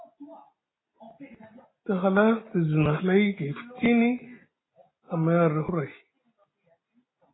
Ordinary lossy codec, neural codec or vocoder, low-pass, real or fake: AAC, 16 kbps; none; 7.2 kHz; real